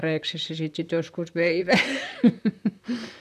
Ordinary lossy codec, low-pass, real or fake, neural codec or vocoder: none; 14.4 kHz; fake; vocoder, 44.1 kHz, 128 mel bands, Pupu-Vocoder